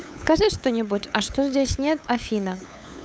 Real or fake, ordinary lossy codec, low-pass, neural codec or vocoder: fake; none; none; codec, 16 kHz, 8 kbps, FunCodec, trained on LibriTTS, 25 frames a second